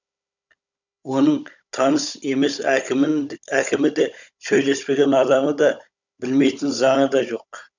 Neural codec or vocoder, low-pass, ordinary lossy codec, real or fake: codec, 16 kHz, 16 kbps, FunCodec, trained on Chinese and English, 50 frames a second; 7.2 kHz; none; fake